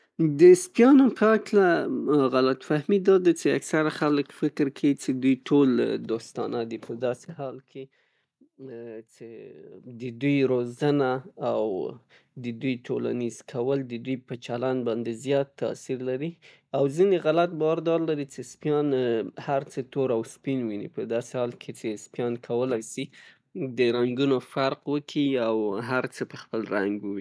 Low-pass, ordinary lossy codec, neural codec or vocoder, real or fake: none; none; none; real